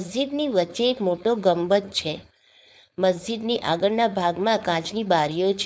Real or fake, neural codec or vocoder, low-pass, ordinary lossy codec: fake; codec, 16 kHz, 4.8 kbps, FACodec; none; none